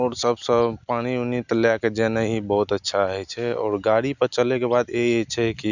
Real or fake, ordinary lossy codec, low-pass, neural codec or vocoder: real; none; 7.2 kHz; none